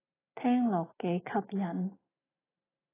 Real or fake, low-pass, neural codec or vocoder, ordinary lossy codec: real; 3.6 kHz; none; AAC, 16 kbps